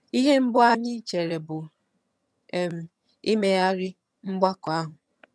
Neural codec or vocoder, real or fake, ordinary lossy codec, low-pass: vocoder, 22.05 kHz, 80 mel bands, HiFi-GAN; fake; none; none